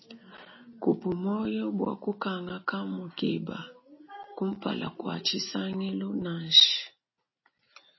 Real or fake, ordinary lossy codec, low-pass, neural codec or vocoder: real; MP3, 24 kbps; 7.2 kHz; none